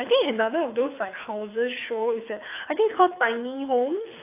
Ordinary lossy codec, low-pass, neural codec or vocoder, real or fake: AAC, 24 kbps; 3.6 kHz; codec, 24 kHz, 6 kbps, HILCodec; fake